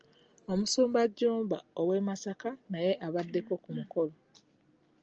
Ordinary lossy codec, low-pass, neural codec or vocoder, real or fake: Opus, 24 kbps; 7.2 kHz; none; real